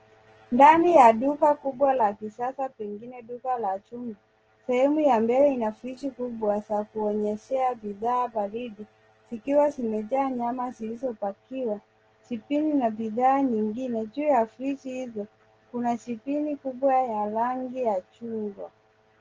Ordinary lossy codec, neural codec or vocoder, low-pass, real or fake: Opus, 16 kbps; none; 7.2 kHz; real